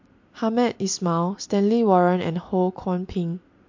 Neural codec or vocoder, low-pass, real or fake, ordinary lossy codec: none; 7.2 kHz; real; MP3, 48 kbps